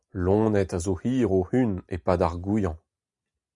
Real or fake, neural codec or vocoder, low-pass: real; none; 10.8 kHz